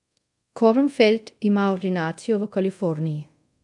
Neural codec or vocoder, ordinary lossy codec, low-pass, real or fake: codec, 24 kHz, 0.5 kbps, DualCodec; MP3, 64 kbps; 10.8 kHz; fake